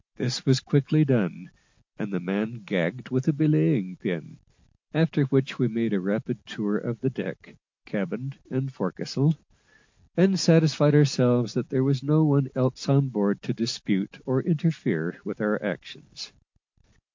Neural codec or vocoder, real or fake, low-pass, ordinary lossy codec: none; real; 7.2 kHz; MP3, 48 kbps